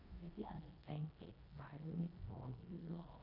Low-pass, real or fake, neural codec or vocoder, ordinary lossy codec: 5.4 kHz; fake; codec, 16 kHz in and 24 kHz out, 0.9 kbps, LongCat-Audio-Codec, four codebook decoder; none